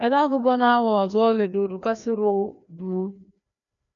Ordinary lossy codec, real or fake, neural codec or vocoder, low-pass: MP3, 96 kbps; fake; codec, 16 kHz, 1 kbps, FreqCodec, larger model; 7.2 kHz